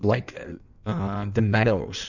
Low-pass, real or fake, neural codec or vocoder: 7.2 kHz; fake; codec, 16 kHz in and 24 kHz out, 1.1 kbps, FireRedTTS-2 codec